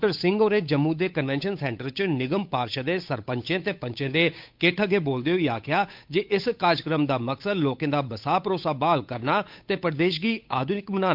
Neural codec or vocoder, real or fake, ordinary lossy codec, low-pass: codec, 16 kHz, 8 kbps, FunCodec, trained on Chinese and English, 25 frames a second; fake; MP3, 48 kbps; 5.4 kHz